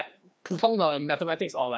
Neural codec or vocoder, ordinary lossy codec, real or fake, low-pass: codec, 16 kHz, 1 kbps, FreqCodec, larger model; none; fake; none